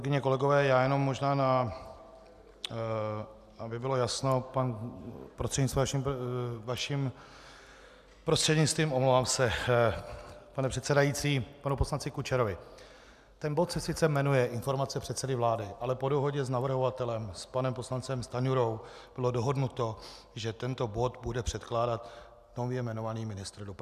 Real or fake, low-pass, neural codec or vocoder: real; 14.4 kHz; none